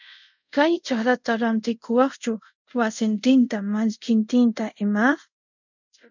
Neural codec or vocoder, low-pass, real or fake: codec, 24 kHz, 0.5 kbps, DualCodec; 7.2 kHz; fake